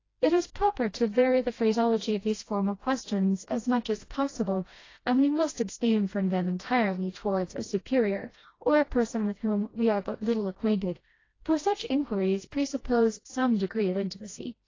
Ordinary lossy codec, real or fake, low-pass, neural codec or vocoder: AAC, 32 kbps; fake; 7.2 kHz; codec, 16 kHz, 1 kbps, FreqCodec, smaller model